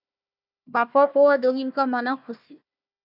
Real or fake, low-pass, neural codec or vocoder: fake; 5.4 kHz; codec, 16 kHz, 1 kbps, FunCodec, trained on Chinese and English, 50 frames a second